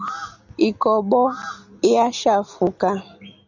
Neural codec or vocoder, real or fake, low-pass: none; real; 7.2 kHz